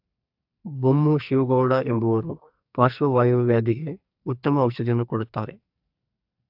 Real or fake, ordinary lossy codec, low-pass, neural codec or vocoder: fake; AAC, 48 kbps; 5.4 kHz; codec, 44.1 kHz, 2.6 kbps, SNAC